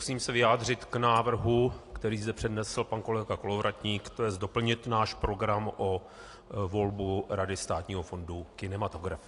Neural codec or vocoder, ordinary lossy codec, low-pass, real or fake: none; AAC, 48 kbps; 10.8 kHz; real